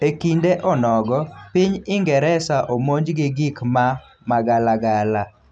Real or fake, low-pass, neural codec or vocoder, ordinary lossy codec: real; 9.9 kHz; none; none